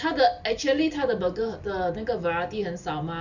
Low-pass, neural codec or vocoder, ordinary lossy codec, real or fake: 7.2 kHz; none; none; real